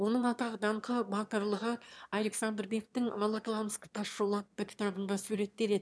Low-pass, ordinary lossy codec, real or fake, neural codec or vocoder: none; none; fake; autoencoder, 22.05 kHz, a latent of 192 numbers a frame, VITS, trained on one speaker